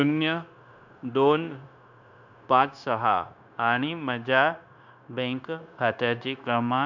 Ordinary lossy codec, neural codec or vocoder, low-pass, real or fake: none; codec, 16 kHz, 0.9 kbps, LongCat-Audio-Codec; 7.2 kHz; fake